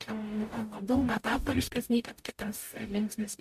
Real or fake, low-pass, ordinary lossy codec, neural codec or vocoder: fake; 14.4 kHz; MP3, 64 kbps; codec, 44.1 kHz, 0.9 kbps, DAC